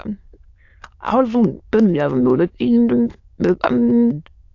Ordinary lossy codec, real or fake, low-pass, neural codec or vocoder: AAC, 48 kbps; fake; 7.2 kHz; autoencoder, 22.05 kHz, a latent of 192 numbers a frame, VITS, trained on many speakers